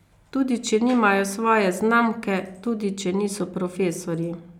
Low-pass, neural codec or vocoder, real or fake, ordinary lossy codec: 19.8 kHz; none; real; none